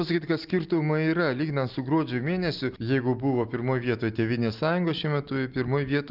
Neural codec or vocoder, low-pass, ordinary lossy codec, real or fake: none; 5.4 kHz; Opus, 24 kbps; real